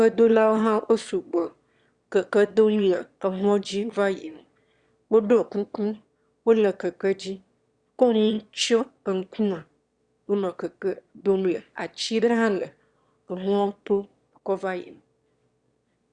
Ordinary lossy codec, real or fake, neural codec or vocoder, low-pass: Opus, 64 kbps; fake; autoencoder, 22.05 kHz, a latent of 192 numbers a frame, VITS, trained on one speaker; 9.9 kHz